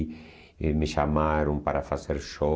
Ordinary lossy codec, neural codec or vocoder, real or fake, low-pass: none; none; real; none